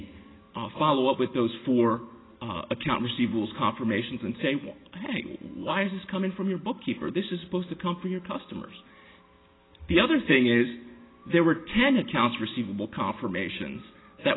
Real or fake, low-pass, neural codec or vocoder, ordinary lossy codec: real; 7.2 kHz; none; AAC, 16 kbps